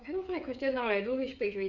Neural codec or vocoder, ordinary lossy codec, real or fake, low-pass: codec, 16 kHz, 8 kbps, FreqCodec, smaller model; none; fake; 7.2 kHz